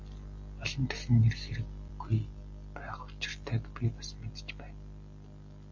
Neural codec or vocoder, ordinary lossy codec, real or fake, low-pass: none; AAC, 48 kbps; real; 7.2 kHz